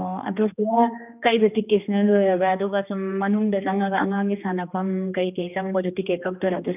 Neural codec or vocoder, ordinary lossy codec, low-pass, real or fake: codec, 16 kHz, 2 kbps, X-Codec, HuBERT features, trained on general audio; none; 3.6 kHz; fake